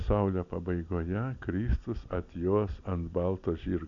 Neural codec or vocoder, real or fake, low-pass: none; real; 7.2 kHz